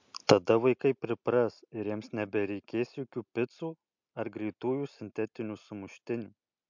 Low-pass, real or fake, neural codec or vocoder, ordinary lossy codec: 7.2 kHz; real; none; MP3, 64 kbps